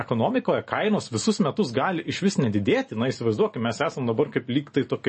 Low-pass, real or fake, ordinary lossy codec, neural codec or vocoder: 10.8 kHz; real; MP3, 32 kbps; none